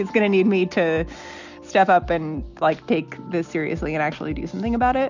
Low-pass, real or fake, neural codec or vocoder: 7.2 kHz; real; none